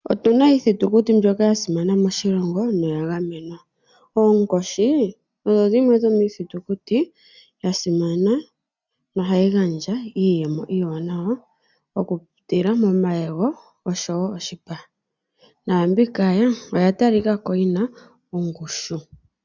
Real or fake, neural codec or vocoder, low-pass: real; none; 7.2 kHz